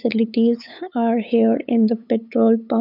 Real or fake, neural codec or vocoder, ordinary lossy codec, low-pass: fake; codec, 16 kHz, 8 kbps, FunCodec, trained on LibriTTS, 25 frames a second; none; 5.4 kHz